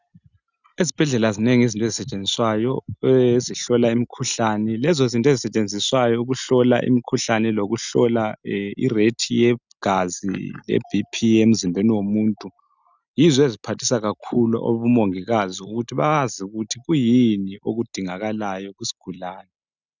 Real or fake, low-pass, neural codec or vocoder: real; 7.2 kHz; none